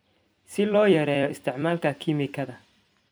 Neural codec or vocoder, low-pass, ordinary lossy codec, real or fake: vocoder, 44.1 kHz, 128 mel bands every 256 samples, BigVGAN v2; none; none; fake